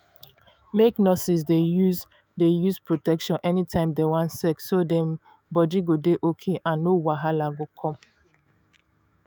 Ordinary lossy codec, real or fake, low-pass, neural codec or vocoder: none; fake; none; autoencoder, 48 kHz, 128 numbers a frame, DAC-VAE, trained on Japanese speech